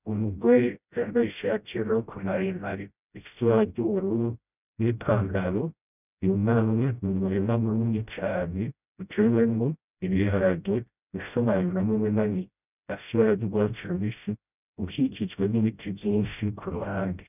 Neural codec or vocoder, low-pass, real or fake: codec, 16 kHz, 0.5 kbps, FreqCodec, smaller model; 3.6 kHz; fake